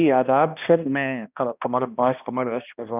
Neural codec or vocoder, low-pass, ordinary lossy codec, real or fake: codec, 16 kHz, 1 kbps, X-Codec, HuBERT features, trained on balanced general audio; 3.6 kHz; none; fake